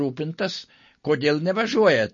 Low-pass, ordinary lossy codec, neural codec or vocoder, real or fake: 7.2 kHz; MP3, 32 kbps; none; real